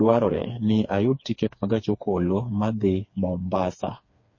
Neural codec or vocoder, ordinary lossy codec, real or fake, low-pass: codec, 16 kHz, 4 kbps, FreqCodec, smaller model; MP3, 32 kbps; fake; 7.2 kHz